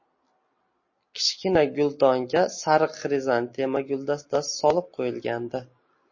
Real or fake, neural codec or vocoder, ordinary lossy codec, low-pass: real; none; MP3, 32 kbps; 7.2 kHz